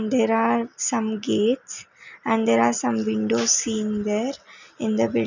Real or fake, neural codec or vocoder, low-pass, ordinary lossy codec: real; none; 7.2 kHz; none